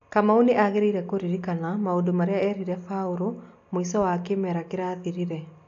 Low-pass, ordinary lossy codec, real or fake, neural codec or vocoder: 7.2 kHz; AAC, 48 kbps; real; none